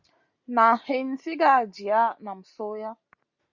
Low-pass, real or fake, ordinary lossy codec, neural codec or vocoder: 7.2 kHz; real; Opus, 64 kbps; none